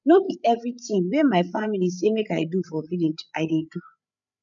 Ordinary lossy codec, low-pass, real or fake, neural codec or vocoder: none; 7.2 kHz; fake; codec, 16 kHz, 8 kbps, FreqCodec, larger model